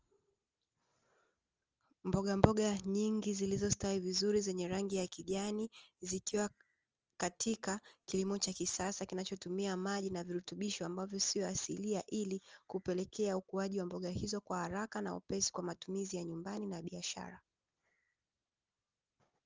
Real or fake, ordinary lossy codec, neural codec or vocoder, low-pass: real; Opus, 24 kbps; none; 7.2 kHz